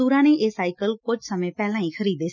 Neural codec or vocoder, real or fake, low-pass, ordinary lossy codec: none; real; 7.2 kHz; none